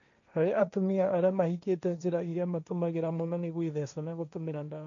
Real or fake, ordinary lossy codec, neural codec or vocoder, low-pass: fake; none; codec, 16 kHz, 1.1 kbps, Voila-Tokenizer; 7.2 kHz